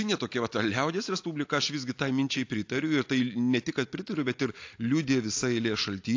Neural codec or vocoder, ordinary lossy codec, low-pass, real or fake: none; AAC, 48 kbps; 7.2 kHz; real